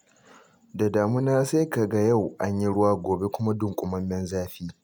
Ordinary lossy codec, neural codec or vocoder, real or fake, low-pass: none; none; real; none